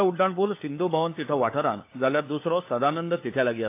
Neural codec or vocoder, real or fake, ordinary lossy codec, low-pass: codec, 16 kHz, 4 kbps, FunCodec, trained on LibriTTS, 50 frames a second; fake; AAC, 24 kbps; 3.6 kHz